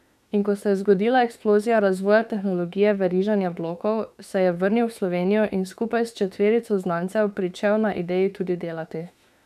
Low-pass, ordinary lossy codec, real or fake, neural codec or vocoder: 14.4 kHz; none; fake; autoencoder, 48 kHz, 32 numbers a frame, DAC-VAE, trained on Japanese speech